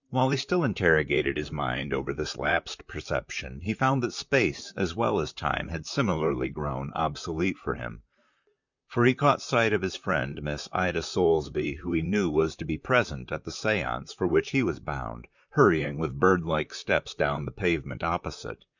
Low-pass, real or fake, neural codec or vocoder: 7.2 kHz; fake; vocoder, 44.1 kHz, 128 mel bands, Pupu-Vocoder